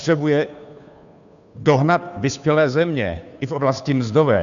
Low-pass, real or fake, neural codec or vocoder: 7.2 kHz; fake; codec, 16 kHz, 2 kbps, FunCodec, trained on Chinese and English, 25 frames a second